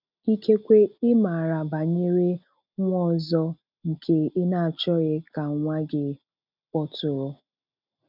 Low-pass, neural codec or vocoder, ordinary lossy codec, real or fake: 5.4 kHz; none; none; real